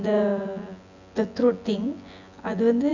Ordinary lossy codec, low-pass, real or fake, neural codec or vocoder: none; 7.2 kHz; fake; vocoder, 24 kHz, 100 mel bands, Vocos